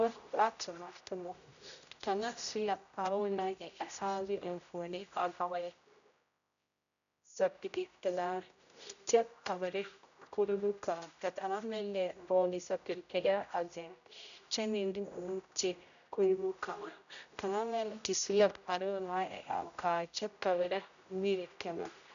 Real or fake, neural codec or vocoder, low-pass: fake; codec, 16 kHz, 0.5 kbps, X-Codec, HuBERT features, trained on general audio; 7.2 kHz